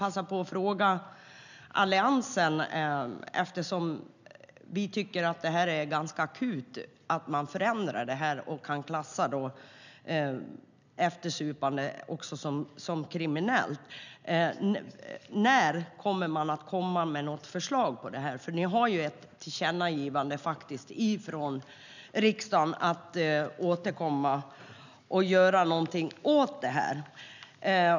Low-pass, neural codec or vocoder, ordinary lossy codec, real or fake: 7.2 kHz; none; none; real